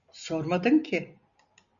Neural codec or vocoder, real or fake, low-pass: none; real; 7.2 kHz